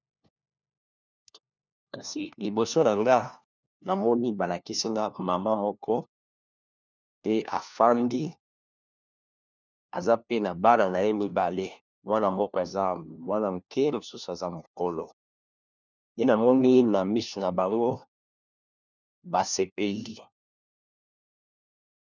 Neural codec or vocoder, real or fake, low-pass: codec, 16 kHz, 1 kbps, FunCodec, trained on LibriTTS, 50 frames a second; fake; 7.2 kHz